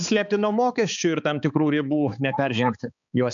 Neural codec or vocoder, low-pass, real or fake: codec, 16 kHz, 4 kbps, X-Codec, HuBERT features, trained on balanced general audio; 7.2 kHz; fake